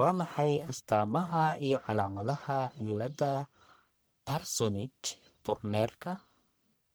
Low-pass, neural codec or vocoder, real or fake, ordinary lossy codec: none; codec, 44.1 kHz, 1.7 kbps, Pupu-Codec; fake; none